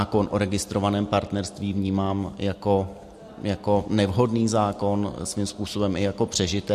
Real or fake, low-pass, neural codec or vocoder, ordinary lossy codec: real; 14.4 kHz; none; MP3, 64 kbps